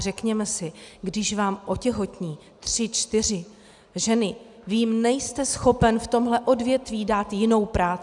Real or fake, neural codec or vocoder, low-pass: real; none; 10.8 kHz